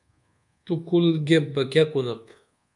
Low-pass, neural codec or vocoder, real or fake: 10.8 kHz; codec, 24 kHz, 1.2 kbps, DualCodec; fake